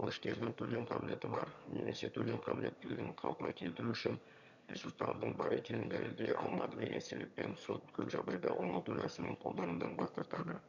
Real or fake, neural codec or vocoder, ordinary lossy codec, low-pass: fake; autoencoder, 22.05 kHz, a latent of 192 numbers a frame, VITS, trained on one speaker; none; 7.2 kHz